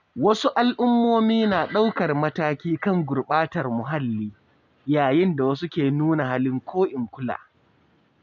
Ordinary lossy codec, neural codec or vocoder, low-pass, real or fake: none; none; 7.2 kHz; real